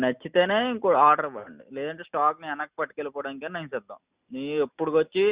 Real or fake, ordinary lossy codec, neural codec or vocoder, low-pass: real; Opus, 64 kbps; none; 3.6 kHz